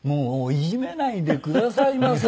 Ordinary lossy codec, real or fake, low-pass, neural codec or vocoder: none; real; none; none